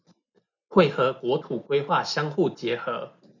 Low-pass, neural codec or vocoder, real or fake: 7.2 kHz; none; real